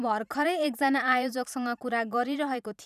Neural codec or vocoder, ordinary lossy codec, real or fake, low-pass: vocoder, 44.1 kHz, 128 mel bands every 512 samples, BigVGAN v2; none; fake; 14.4 kHz